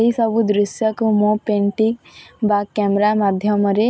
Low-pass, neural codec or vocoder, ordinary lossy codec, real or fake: none; none; none; real